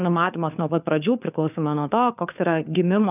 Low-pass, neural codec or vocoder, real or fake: 3.6 kHz; codec, 16 kHz, 4 kbps, FunCodec, trained on LibriTTS, 50 frames a second; fake